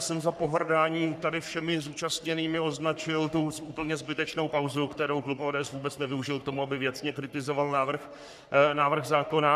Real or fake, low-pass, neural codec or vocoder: fake; 14.4 kHz; codec, 44.1 kHz, 3.4 kbps, Pupu-Codec